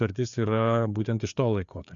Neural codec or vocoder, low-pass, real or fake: codec, 16 kHz, 4 kbps, FreqCodec, larger model; 7.2 kHz; fake